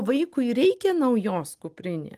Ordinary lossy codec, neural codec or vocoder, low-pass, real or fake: Opus, 24 kbps; none; 14.4 kHz; real